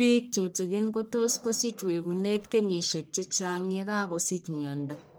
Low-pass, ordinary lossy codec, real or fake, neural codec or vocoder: none; none; fake; codec, 44.1 kHz, 1.7 kbps, Pupu-Codec